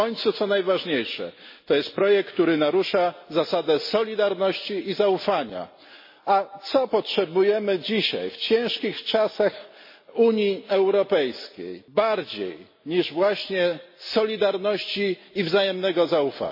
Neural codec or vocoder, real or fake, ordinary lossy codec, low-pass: none; real; MP3, 24 kbps; 5.4 kHz